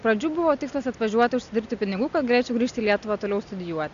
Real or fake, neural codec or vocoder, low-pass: real; none; 7.2 kHz